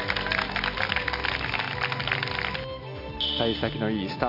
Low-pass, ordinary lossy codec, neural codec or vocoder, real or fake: 5.4 kHz; none; none; real